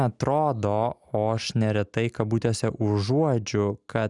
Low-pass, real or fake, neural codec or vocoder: 10.8 kHz; real; none